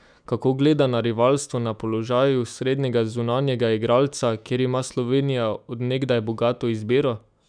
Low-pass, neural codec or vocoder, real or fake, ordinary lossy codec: 9.9 kHz; autoencoder, 48 kHz, 128 numbers a frame, DAC-VAE, trained on Japanese speech; fake; none